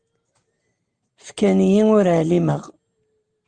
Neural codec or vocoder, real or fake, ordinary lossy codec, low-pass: none; real; Opus, 24 kbps; 9.9 kHz